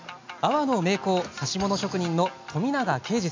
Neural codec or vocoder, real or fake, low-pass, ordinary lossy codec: none; real; 7.2 kHz; MP3, 64 kbps